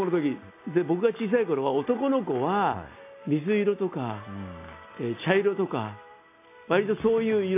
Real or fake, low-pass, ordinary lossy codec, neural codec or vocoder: real; 3.6 kHz; AAC, 24 kbps; none